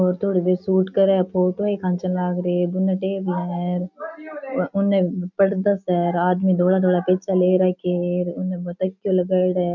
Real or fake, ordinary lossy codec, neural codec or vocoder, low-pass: real; none; none; 7.2 kHz